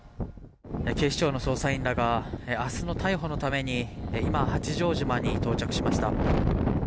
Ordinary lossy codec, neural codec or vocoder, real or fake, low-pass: none; none; real; none